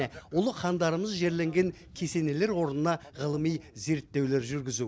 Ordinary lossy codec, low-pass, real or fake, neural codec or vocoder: none; none; real; none